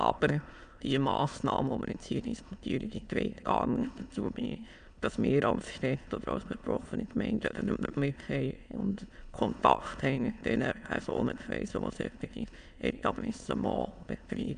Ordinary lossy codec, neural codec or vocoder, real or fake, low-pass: none; autoencoder, 22.05 kHz, a latent of 192 numbers a frame, VITS, trained on many speakers; fake; 9.9 kHz